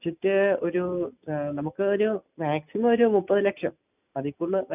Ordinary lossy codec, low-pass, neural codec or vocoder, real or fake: none; 3.6 kHz; none; real